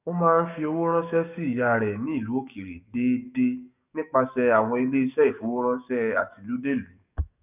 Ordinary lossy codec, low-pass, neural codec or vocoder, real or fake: none; 3.6 kHz; codec, 44.1 kHz, 7.8 kbps, DAC; fake